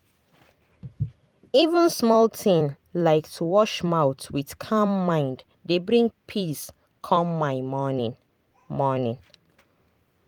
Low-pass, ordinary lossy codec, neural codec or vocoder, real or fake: 19.8 kHz; Opus, 32 kbps; vocoder, 44.1 kHz, 128 mel bands every 256 samples, BigVGAN v2; fake